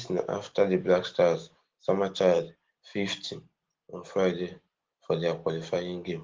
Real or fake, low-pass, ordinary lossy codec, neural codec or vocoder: real; 7.2 kHz; Opus, 16 kbps; none